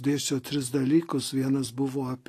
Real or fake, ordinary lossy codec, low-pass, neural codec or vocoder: real; AAC, 48 kbps; 14.4 kHz; none